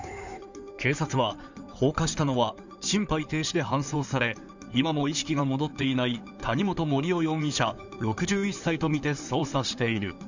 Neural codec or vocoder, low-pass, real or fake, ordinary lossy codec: codec, 16 kHz in and 24 kHz out, 2.2 kbps, FireRedTTS-2 codec; 7.2 kHz; fake; none